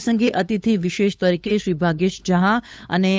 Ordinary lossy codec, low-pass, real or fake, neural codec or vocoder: none; none; fake; codec, 16 kHz, 8 kbps, FunCodec, trained on LibriTTS, 25 frames a second